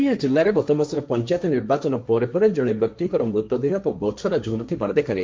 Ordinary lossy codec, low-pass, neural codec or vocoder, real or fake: none; none; codec, 16 kHz, 1.1 kbps, Voila-Tokenizer; fake